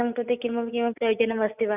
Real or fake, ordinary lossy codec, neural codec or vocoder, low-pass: real; none; none; 3.6 kHz